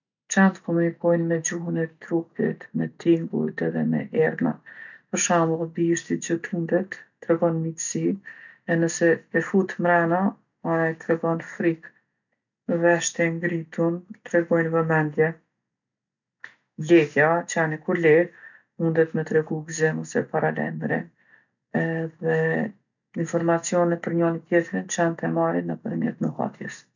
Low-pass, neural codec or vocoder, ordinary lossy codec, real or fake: 7.2 kHz; none; none; real